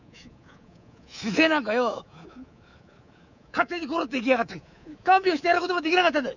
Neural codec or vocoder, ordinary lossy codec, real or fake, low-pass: codec, 24 kHz, 3.1 kbps, DualCodec; none; fake; 7.2 kHz